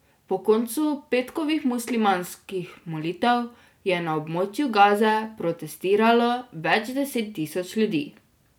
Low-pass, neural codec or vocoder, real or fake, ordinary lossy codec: none; none; real; none